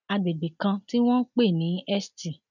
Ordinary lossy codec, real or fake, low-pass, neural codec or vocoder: none; real; 7.2 kHz; none